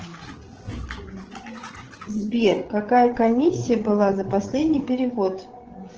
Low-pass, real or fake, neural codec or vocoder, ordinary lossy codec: 7.2 kHz; fake; codec, 16 kHz, 16 kbps, FreqCodec, larger model; Opus, 16 kbps